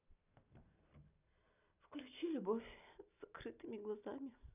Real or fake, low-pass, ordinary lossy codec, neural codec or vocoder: real; 3.6 kHz; none; none